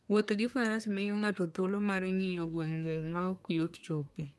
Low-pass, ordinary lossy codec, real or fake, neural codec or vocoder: none; none; fake; codec, 24 kHz, 1 kbps, SNAC